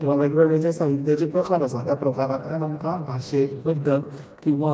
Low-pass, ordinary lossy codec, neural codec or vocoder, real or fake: none; none; codec, 16 kHz, 1 kbps, FreqCodec, smaller model; fake